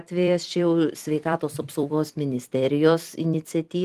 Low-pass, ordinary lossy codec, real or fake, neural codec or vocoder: 14.4 kHz; Opus, 24 kbps; fake; vocoder, 44.1 kHz, 128 mel bands every 256 samples, BigVGAN v2